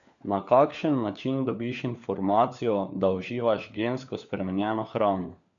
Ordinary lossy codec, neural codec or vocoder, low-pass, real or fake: none; codec, 16 kHz, 4 kbps, FunCodec, trained on LibriTTS, 50 frames a second; 7.2 kHz; fake